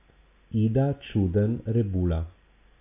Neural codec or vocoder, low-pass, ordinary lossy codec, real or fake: none; 3.6 kHz; AAC, 32 kbps; real